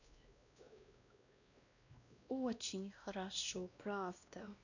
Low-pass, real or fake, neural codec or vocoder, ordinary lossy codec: 7.2 kHz; fake; codec, 16 kHz, 1 kbps, X-Codec, WavLM features, trained on Multilingual LibriSpeech; none